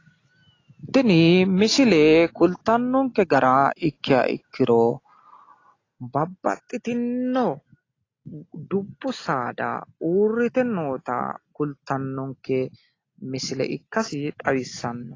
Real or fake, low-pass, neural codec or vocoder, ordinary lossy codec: real; 7.2 kHz; none; AAC, 32 kbps